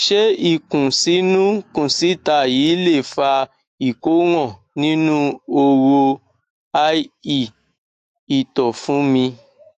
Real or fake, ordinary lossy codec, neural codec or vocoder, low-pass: fake; AAC, 64 kbps; autoencoder, 48 kHz, 128 numbers a frame, DAC-VAE, trained on Japanese speech; 14.4 kHz